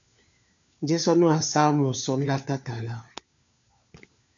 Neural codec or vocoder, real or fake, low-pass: codec, 16 kHz, 4 kbps, FunCodec, trained on LibriTTS, 50 frames a second; fake; 7.2 kHz